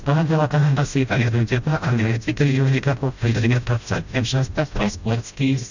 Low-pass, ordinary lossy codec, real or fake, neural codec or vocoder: 7.2 kHz; none; fake; codec, 16 kHz, 0.5 kbps, FreqCodec, smaller model